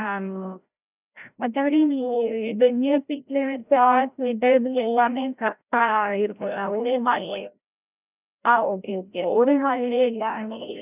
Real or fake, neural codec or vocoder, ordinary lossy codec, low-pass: fake; codec, 16 kHz, 0.5 kbps, FreqCodec, larger model; none; 3.6 kHz